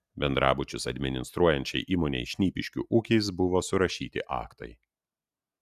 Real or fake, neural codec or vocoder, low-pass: real; none; 14.4 kHz